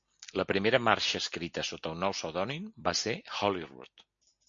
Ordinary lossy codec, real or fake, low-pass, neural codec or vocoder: MP3, 48 kbps; real; 7.2 kHz; none